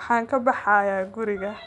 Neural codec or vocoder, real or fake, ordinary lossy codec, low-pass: none; real; none; 10.8 kHz